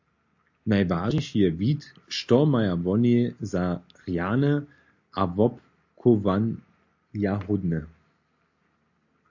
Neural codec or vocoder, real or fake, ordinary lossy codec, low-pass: none; real; AAC, 48 kbps; 7.2 kHz